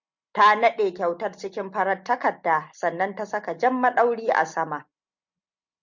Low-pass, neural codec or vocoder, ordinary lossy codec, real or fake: 7.2 kHz; none; MP3, 64 kbps; real